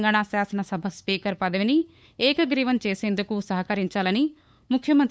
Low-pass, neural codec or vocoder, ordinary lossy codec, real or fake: none; codec, 16 kHz, 8 kbps, FunCodec, trained on LibriTTS, 25 frames a second; none; fake